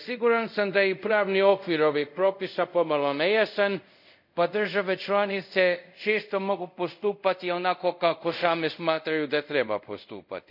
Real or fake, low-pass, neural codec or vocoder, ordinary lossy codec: fake; 5.4 kHz; codec, 24 kHz, 0.5 kbps, DualCodec; none